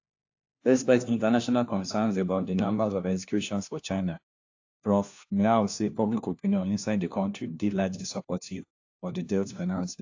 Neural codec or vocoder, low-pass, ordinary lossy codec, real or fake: codec, 16 kHz, 1 kbps, FunCodec, trained on LibriTTS, 50 frames a second; 7.2 kHz; AAC, 48 kbps; fake